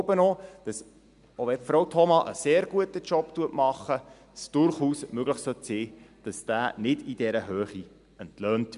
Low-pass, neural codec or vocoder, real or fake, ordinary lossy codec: 10.8 kHz; none; real; none